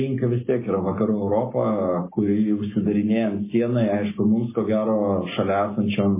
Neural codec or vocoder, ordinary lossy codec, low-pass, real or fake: none; MP3, 16 kbps; 3.6 kHz; real